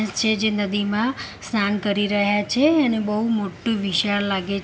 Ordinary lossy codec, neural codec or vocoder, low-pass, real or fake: none; none; none; real